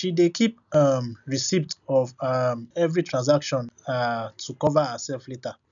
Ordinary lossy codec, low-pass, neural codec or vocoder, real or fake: none; 7.2 kHz; none; real